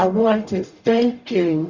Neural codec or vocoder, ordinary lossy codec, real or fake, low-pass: codec, 44.1 kHz, 0.9 kbps, DAC; Opus, 64 kbps; fake; 7.2 kHz